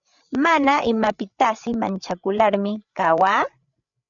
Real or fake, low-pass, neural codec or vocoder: fake; 7.2 kHz; codec, 16 kHz, 8 kbps, FreqCodec, larger model